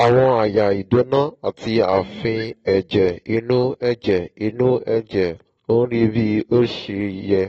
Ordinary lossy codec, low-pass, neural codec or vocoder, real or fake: AAC, 24 kbps; 19.8 kHz; none; real